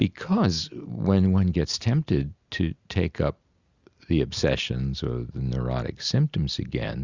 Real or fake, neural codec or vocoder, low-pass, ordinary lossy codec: real; none; 7.2 kHz; Opus, 64 kbps